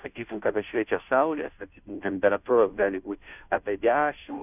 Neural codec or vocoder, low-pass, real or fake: codec, 16 kHz, 0.5 kbps, FunCodec, trained on Chinese and English, 25 frames a second; 3.6 kHz; fake